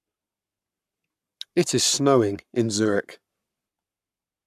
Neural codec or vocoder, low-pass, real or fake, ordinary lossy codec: codec, 44.1 kHz, 7.8 kbps, Pupu-Codec; 14.4 kHz; fake; none